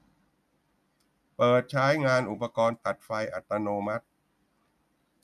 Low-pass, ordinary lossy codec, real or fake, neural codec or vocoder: 14.4 kHz; none; real; none